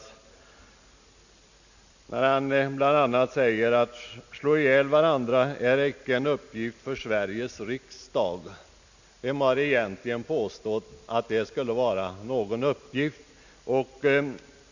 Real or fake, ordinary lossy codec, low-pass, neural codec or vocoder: real; none; 7.2 kHz; none